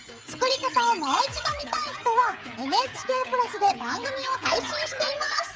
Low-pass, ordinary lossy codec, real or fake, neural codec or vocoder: none; none; fake; codec, 16 kHz, 16 kbps, FreqCodec, smaller model